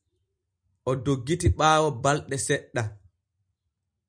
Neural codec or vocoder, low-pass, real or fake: none; 9.9 kHz; real